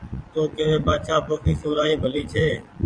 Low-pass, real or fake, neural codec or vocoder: 9.9 kHz; fake; vocoder, 22.05 kHz, 80 mel bands, Vocos